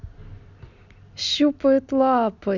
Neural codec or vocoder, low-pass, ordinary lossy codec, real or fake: none; 7.2 kHz; none; real